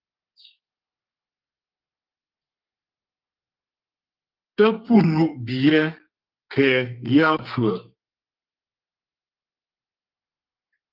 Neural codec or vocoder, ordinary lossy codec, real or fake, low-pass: codec, 32 kHz, 1.9 kbps, SNAC; Opus, 24 kbps; fake; 5.4 kHz